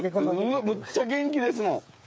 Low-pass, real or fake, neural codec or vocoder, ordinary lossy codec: none; fake; codec, 16 kHz, 8 kbps, FreqCodec, smaller model; none